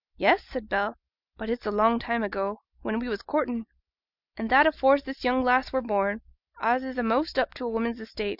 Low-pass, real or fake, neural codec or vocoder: 5.4 kHz; real; none